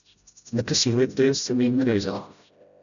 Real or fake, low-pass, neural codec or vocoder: fake; 7.2 kHz; codec, 16 kHz, 0.5 kbps, FreqCodec, smaller model